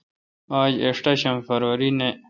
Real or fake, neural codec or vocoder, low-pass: real; none; 7.2 kHz